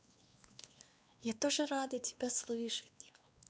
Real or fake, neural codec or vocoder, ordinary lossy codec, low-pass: fake; codec, 16 kHz, 2 kbps, X-Codec, WavLM features, trained on Multilingual LibriSpeech; none; none